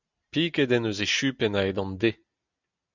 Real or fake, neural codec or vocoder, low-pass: real; none; 7.2 kHz